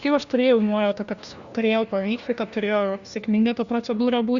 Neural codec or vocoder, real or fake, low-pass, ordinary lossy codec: codec, 16 kHz, 1 kbps, FunCodec, trained on LibriTTS, 50 frames a second; fake; 7.2 kHz; Opus, 64 kbps